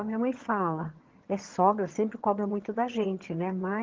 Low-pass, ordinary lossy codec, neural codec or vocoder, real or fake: 7.2 kHz; Opus, 16 kbps; vocoder, 22.05 kHz, 80 mel bands, HiFi-GAN; fake